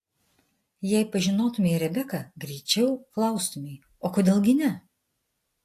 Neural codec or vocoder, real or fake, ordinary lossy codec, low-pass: none; real; AAC, 64 kbps; 14.4 kHz